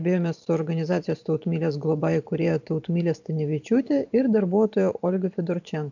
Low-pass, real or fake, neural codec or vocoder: 7.2 kHz; fake; vocoder, 44.1 kHz, 128 mel bands every 512 samples, BigVGAN v2